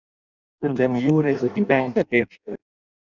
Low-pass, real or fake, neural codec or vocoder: 7.2 kHz; fake; codec, 16 kHz in and 24 kHz out, 0.6 kbps, FireRedTTS-2 codec